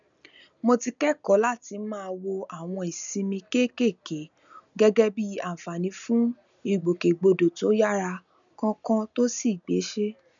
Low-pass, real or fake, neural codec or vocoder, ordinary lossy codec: 7.2 kHz; real; none; none